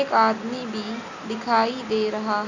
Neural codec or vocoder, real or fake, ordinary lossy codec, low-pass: none; real; none; 7.2 kHz